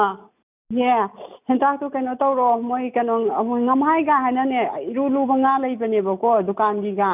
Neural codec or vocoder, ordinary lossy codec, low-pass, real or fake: none; none; 3.6 kHz; real